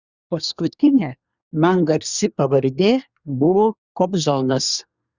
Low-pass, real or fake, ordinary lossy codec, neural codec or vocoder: 7.2 kHz; fake; Opus, 64 kbps; codec, 24 kHz, 1 kbps, SNAC